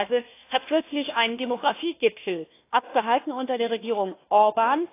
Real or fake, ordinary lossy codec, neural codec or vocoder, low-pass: fake; AAC, 24 kbps; codec, 16 kHz, 1 kbps, FunCodec, trained on Chinese and English, 50 frames a second; 3.6 kHz